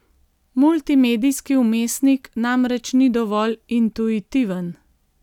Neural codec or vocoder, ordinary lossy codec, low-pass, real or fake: none; none; 19.8 kHz; real